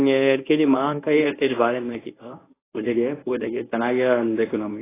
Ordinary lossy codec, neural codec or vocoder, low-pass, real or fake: AAC, 16 kbps; codec, 24 kHz, 0.9 kbps, WavTokenizer, medium speech release version 1; 3.6 kHz; fake